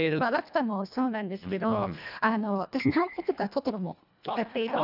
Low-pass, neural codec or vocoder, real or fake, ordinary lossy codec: 5.4 kHz; codec, 24 kHz, 1.5 kbps, HILCodec; fake; none